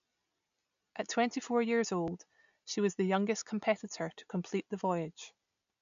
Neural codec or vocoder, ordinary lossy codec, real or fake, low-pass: none; MP3, 96 kbps; real; 7.2 kHz